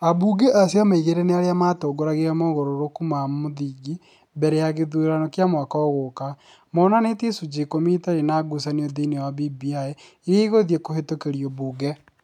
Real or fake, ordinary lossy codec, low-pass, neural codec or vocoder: real; none; 19.8 kHz; none